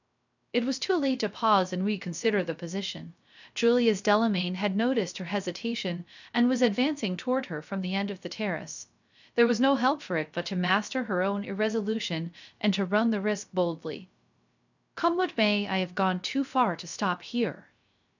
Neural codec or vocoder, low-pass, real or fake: codec, 16 kHz, 0.3 kbps, FocalCodec; 7.2 kHz; fake